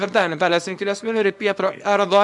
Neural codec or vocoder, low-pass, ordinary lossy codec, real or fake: codec, 24 kHz, 0.9 kbps, WavTokenizer, small release; 10.8 kHz; MP3, 96 kbps; fake